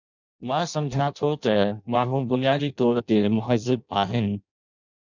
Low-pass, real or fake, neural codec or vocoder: 7.2 kHz; fake; codec, 16 kHz in and 24 kHz out, 0.6 kbps, FireRedTTS-2 codec